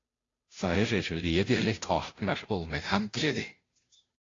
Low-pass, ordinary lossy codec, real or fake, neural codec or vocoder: 7.2 kHz; AAC, 32 kbps; fake; codec, 16 kHz, 0.5 kbps, FunCodec, trained on Chinese and English, 25 frames a second